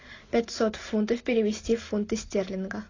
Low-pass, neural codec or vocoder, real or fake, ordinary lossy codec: 7.2 kHz; none; real; AAC, 32 kbps